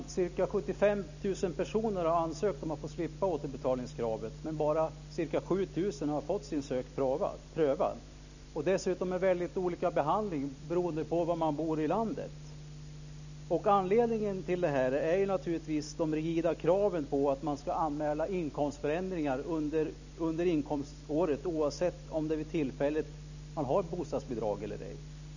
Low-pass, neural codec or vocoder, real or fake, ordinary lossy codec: 7.2 kHz; none; real; none